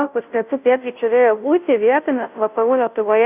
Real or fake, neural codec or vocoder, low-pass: fake; codec, 16 kHz, 0.5 kbps, FunCodec, trained on Chinese and English, 25 frames a second; 3.6 kHz